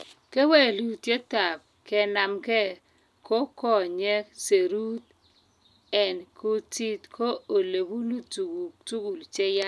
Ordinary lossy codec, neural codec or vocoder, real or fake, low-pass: none; none; real; none